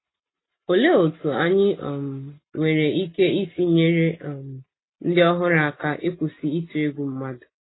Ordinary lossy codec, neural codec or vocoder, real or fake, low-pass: AAC, 16 kbps; none; real; 7.2 kHz